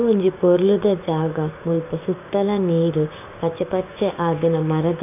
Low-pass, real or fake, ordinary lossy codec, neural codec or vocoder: 3.6 kHz; fake; none; codec, 16 kHz, 6 kbps, DAC